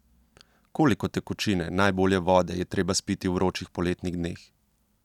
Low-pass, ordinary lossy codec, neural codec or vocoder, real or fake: 19.8 kHz; none; none; real